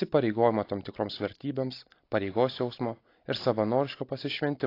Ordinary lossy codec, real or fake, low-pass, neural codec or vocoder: AAC, 32 kbps; fake; 5.4 kHz; vocoder, 44.1 kHz, 128 mel bands every 256 samples, BigVGAN v2